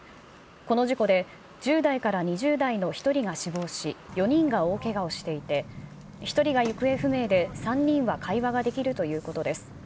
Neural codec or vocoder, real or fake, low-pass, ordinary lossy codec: none; real; none; none